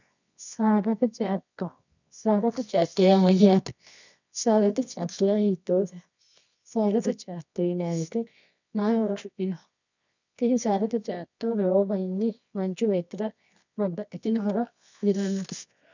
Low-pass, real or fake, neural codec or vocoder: 7.2 kHz; fake; codec, 24 kHz, 0.9 kbps, WavTokenizer, medium music audio release